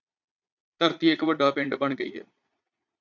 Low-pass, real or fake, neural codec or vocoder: 7.2 kHz; fake; vocoder, 22.05 kHz, 80 mel bands, Vocos